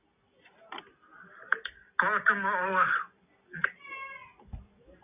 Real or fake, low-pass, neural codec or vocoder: real; 3.6 kHz; none